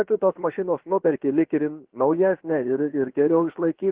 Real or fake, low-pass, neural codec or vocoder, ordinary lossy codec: fake; 3.6 kHz; codec, 16 kHz, 0.7 kbps, FocalCodec; Opus, 16 kbps